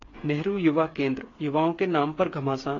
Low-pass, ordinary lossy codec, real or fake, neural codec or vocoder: 7.2 kHz; AAC, 32 kbps; fake; codec, 16 kHz, 8 kbps, FreqCodec, smaller model